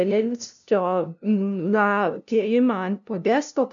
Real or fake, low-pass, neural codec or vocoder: fake; 7.2 kHz; codec, 16 kHz, 0.5 kbps, FunCodec, trained on LibriTTS, 25 frames a second